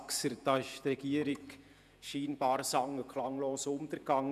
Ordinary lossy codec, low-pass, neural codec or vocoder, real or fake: none; 14.4 kHz; vocoder, 48 kHz, 128 mel bands, Vocos; fake